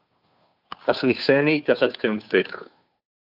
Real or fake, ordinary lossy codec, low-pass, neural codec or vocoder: fake; AAC, 48 kbps; 5.4 kHz; codec, 16 kHz, 2 kbps, FunCodec, trained on Chinese and English, 25 frames a second